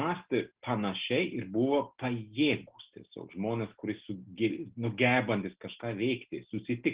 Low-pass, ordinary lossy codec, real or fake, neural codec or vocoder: 3.6 kHz; Opus, 16 kbps; real; none